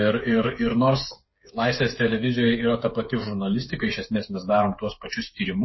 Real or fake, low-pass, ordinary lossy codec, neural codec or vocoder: fake; 7.2 kHz; MP3, 24 kbps; codec, 16 kHz, 8 kbps, FreqCodec, smaller model